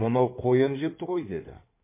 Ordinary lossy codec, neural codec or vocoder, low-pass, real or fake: MP3, 24 kbps; codec, 16 kHz in and 24 kHz out, 2.2 kbps, FireRedTTS-2 codec; 3.6 kHz; fake